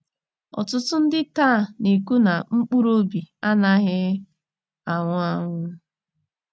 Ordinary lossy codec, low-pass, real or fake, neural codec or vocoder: none; none; real; none